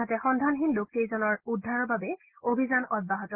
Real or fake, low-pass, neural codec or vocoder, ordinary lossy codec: real; 3.6 kHz; none; Opus, 16 kbps